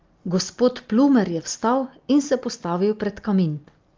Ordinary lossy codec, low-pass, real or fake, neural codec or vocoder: Opus, 32 kbps; 7.2 kHz; real; none